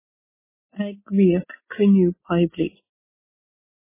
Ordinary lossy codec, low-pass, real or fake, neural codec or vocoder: MP3, 16 kbps; 3.6 kHz; real; none